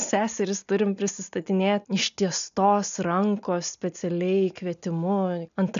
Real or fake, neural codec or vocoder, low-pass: real; none; 7.2 kHz